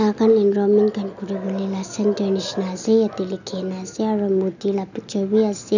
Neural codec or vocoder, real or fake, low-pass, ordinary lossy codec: none; real; 7.2 kHz; none